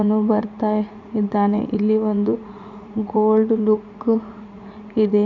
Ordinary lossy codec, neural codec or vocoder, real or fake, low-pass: none; vocoder, 44.1 kHz, 80 mel bands, Vocos; fake; 7.2 kHz